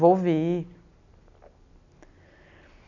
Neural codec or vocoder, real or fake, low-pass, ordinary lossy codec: none; real; 7.2 kHz; none